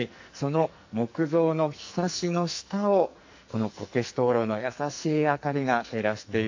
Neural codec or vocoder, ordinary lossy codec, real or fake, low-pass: codec, 44.1 kHz, 2.6 kbps, SNAC; AAC, 48 kbps; fake; 7.2 kHz